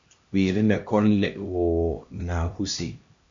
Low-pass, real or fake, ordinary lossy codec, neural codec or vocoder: 7.2 kHz; fake; MP3, 64 kbps; codec, 16 kHz, 1 kbps, X-Codec, HuBERT features, trained on LibriSpeech